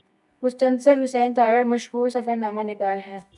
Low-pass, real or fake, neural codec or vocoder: 10.8 kHz; fake; codec, 24 kHz, 0.9 kbps, WavTokenizer, medium music audio release